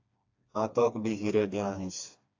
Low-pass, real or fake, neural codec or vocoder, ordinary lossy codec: 7.2 kHz; fake; codec, 16 kHz, 2 kbps, FreqCodec, smaller model; AAC, 48 kbps